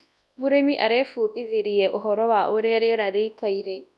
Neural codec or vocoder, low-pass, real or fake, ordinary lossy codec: codec, 24 kHz, 0.9 kbps, WavTokenizer, large speech release; none; fake; none